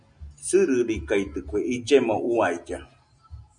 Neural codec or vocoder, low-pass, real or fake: none; 9.9 kHz; real